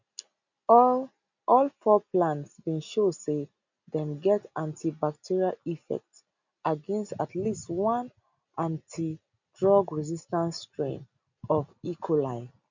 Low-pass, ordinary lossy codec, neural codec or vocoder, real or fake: 7.2 kHz; none; none; real